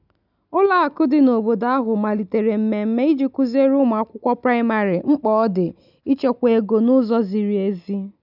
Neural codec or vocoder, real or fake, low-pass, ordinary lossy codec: none; real; 5.4 kHz; none